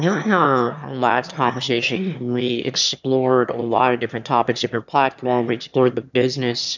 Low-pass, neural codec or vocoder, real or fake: 7.2 kHz; autoencoder, 22.05 kHz, a latent of 192 numbers a frame, VITS, trained on one speaker; fake